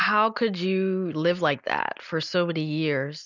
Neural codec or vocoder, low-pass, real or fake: none; 7.2 kHz; real